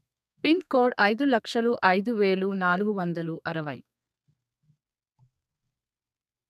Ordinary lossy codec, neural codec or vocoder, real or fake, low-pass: none; codec, 32 kHz, 1.9 kbps, SNAC; fake; 14.4 kHz